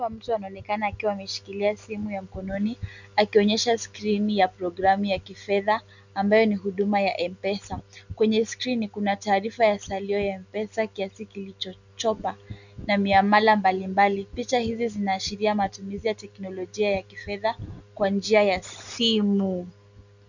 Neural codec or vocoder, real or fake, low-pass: none; real; 7.2 kHz